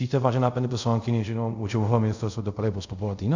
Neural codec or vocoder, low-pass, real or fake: codec, 24 kHz, 0.5 kbps, DualCodec; 7.2 kHz; fake